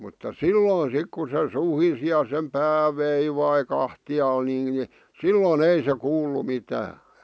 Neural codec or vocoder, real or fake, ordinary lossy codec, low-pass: none; real; none; none